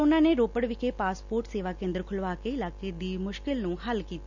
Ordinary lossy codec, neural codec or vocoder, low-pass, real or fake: none; none; 7.2 kHz; real